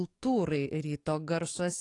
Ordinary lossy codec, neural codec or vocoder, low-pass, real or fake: AAC, 48 kbps; codec, 44.1 kHz, 7.8 kbps, DAC; 10.8 kHz; fake